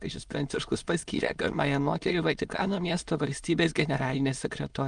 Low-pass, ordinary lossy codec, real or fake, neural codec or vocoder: 9.9 kHz; Opus, 32 kbps; fake; autoencoder, 22.05 kHz, a latent of 192 numbers a frame, VITS, trained on many speakers